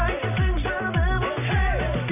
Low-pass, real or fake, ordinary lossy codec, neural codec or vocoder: 3.6 kHz; real; none; none